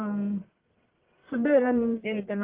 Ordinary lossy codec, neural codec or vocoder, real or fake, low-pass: Opus, 16 kbps; codec, 44.1 kHz, 1.7 kbps, Pupu-Codec; fake; 3.6 kHz